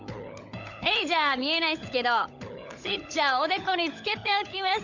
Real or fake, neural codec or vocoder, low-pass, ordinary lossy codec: fake; codec, 16 kHz, 16 kbps, FunCodec, trained on LibriTTS, 50 frames a second; 7.2 kHz; none